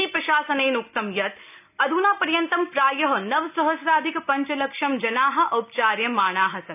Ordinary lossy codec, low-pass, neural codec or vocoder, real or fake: none; 3.6 kHz; none; real